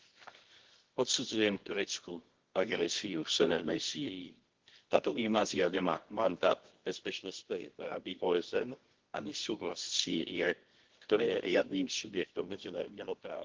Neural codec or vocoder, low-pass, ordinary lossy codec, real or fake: codec, 24 kHz, 0.9 kbps, WavTokenizer, medium music audio release; 7.2 kHz; Opus, 16 kbps; fake